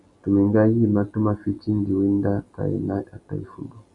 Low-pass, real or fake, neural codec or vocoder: 10.8 kHz; real; none